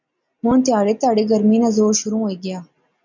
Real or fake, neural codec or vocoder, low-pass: real; none; 7.2 kHz